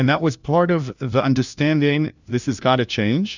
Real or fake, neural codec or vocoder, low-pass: fake; codec, 16 kHz, 1 kbps, FunCodec, trained on LibriTTS, 50 frames a second; 7.2 kHz